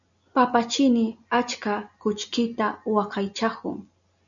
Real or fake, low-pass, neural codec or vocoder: real; 7.2 kHz; none